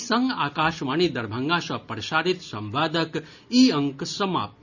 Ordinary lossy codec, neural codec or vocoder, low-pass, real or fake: none; none; 7.2 kHz; real